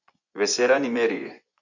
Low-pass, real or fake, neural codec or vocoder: 7.2 kHz; fake; vocoder, 24 kHz, 100 mel bands, Vocos